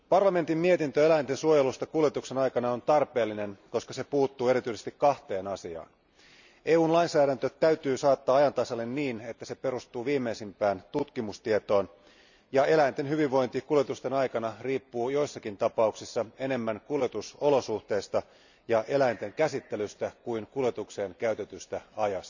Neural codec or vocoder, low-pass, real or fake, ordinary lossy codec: none; 7.2 kHz; real; none